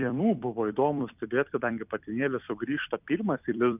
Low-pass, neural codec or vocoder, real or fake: 3.6 kHz; vocoder, 44.1 kHz, 128 mel bands every 256 samples, BigVGAN v2; fake